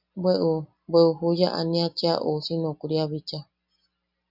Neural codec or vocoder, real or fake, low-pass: none; real; 5.4 kHz